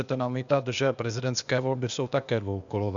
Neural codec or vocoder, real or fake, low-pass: codec, 16 kHz, 0.8 kbps, ZipCodec; fake; 7.2 kHz